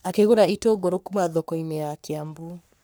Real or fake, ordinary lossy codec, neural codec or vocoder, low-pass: fake; none; codec, 44.1 kHz, 3.4 kbps, Pupu-Codec; none